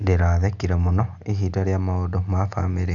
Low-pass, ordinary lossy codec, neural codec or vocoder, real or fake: 7.2 kHz; none; none; real